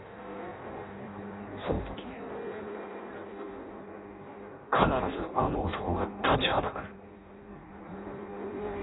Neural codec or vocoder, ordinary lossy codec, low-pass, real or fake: codec, 16 kHz in and 24 kHz out, 0.6 kbps, FireRedTTS-2 codec; AAC, 16 kbps; 7.2 kHz; fake